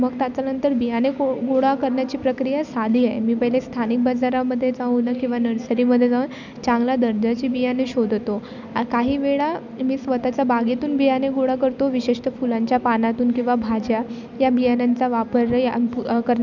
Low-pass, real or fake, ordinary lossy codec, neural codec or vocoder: 7.2 kHz; real; none; none